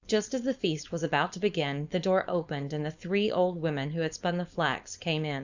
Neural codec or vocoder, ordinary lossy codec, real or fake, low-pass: codec, 16 kHz, 4.8 kbps, FACodec; Opus, 64 kbps; fake; 7.2 kHz